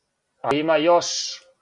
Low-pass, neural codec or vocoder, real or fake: 10.8 kHz; none; real